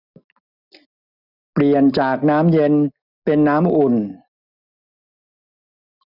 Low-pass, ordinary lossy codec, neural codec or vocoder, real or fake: 5.4 kHz; none; none; real